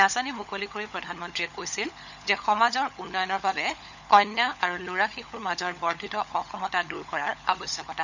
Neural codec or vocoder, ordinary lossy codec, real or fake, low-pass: codec, 16 kHz, 16 kbps, FunCodec, trained on LibriTTS, 50 frames a second; none; fake; 7.2 kHz